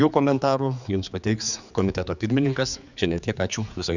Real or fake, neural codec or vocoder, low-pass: fake; codec, 16 kHz, 4 kbps, X-Codec, HuBERT features, trained on general audio; 7.2 kHz